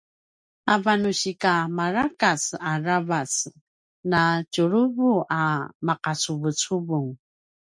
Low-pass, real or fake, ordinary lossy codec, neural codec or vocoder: 9.9 kHz; real; MP3, 64 kbps; none